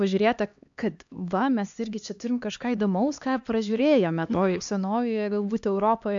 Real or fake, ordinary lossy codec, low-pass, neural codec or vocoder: fake; AAC, 64 kbps; 7.2 kHz; codec, 16 kHz, 2 kbps, X-Codec, HuBERT features, trained on LibriSpeech